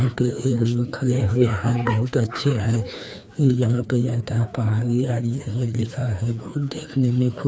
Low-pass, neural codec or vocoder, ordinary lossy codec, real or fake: none; codec, 16 kHz, 2 kbps, FreqCodec, larger model; none; fake